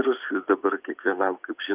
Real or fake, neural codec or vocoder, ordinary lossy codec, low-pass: real; none; Opus, 24 kbps; 3.6 kHz